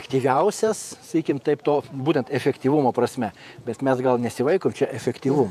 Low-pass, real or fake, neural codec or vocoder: 14.4 kHz; fake; vocoder, 48 kHz, 128 mel bands, Vocos